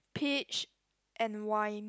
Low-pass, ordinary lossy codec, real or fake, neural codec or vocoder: none; none; real; none